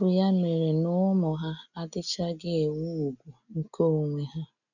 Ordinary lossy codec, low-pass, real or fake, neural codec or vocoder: none; 7.2 kHz; real; none